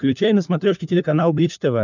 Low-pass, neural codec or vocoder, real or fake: 7.2 kHz; codec, 16 kHz, 4 kbps, FunCodec, trained on LibriTTS, 50 frames a second; fake